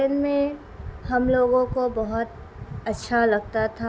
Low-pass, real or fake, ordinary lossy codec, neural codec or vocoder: none; real; none; none